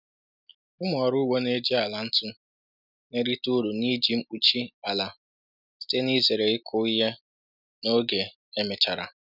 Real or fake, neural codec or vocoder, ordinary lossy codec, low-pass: real; none; none; 5.4 kHz